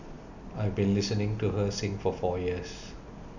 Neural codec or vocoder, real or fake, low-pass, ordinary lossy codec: none; real; 7.2 kHz; none